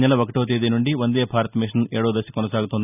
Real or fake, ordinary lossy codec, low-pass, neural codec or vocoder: real; none; 3.6 kHz; none